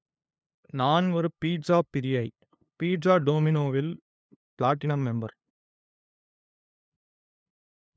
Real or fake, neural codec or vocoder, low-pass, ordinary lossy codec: fake; codec, 16 kHz, 2 kbps, FunCodec, trained on LibriTTS, 25 frames a second; none; none